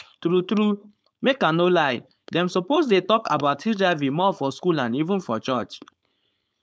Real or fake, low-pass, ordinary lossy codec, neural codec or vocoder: fake; none; none; codec, 16 kHz, 4.8 kbps, FACodec